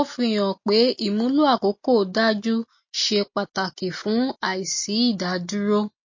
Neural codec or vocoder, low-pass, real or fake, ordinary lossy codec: none; 7.2 kHz; real; MP3, 32 kbps